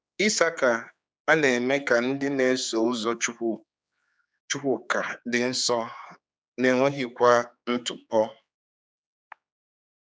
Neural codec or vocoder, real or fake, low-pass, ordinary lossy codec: codec, 16 kHz, 4 kbps, X-Codec, HuBERT features, trained on general audio; fake; none; none